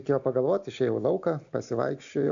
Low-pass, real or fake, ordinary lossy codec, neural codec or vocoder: 7.2 kHz; real; MP3, 64 kbps; none